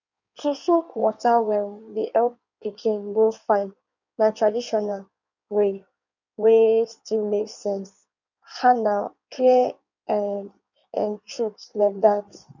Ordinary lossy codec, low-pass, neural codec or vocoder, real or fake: none; 7.2 kHz; codec, 16 kHz in and 24 kHz out, 1.1 kbps, FireRedTTS-2 codec; fake